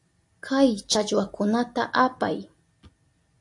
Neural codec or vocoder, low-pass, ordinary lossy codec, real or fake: none; 10.8 kHz; AAC, 64 kbps; real